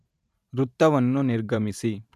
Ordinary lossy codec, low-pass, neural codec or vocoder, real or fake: none; 14.4 kHz; vocoder, 44.1 kHz, 128 mel bands every 512 samples, BigVGAN v2; fake